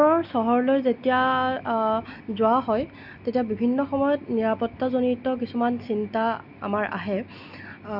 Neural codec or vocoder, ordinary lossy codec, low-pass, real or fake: none; none; 5.4 kHz; real